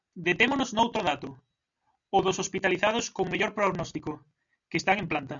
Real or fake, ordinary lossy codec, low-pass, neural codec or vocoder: real; MP3, 96 kbps; 7.2 kHz; none